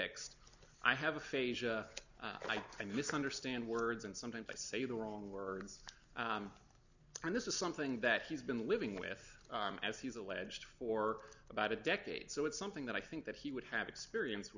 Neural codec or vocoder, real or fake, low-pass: none; real; 7.2 kHz